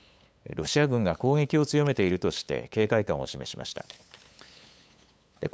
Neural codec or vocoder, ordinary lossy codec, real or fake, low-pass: codec, 16 kHz, 8 kbps, FunCodec, trained on LibriTTS, 25 frames a second; none; fake; none